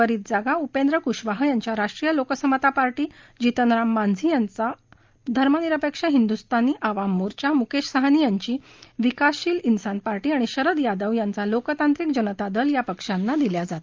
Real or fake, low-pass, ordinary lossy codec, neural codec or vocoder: real; 7.2 kHz; Opus, 24 kbps; none